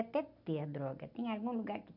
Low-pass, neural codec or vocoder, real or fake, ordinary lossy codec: 5.4 kHz; none; real; none